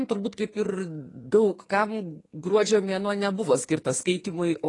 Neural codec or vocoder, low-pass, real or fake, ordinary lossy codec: codec, 44.1 kHz, 2.6 kbps, SNAC; 10.8 kHz; fake; AAC, 32 kbps